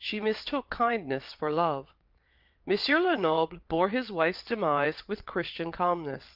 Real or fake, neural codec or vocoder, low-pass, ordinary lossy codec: fake; vocoder, 22.05 kHz, 80 mel bands, WaveNeXt; 5.4 kHz; Opus, 32 kbps